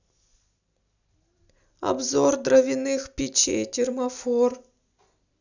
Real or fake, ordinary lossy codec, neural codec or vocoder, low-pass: real; none; none; 7.2 kHz